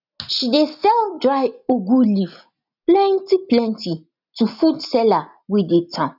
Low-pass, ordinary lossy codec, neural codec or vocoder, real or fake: 5.4 kHz; none; none; real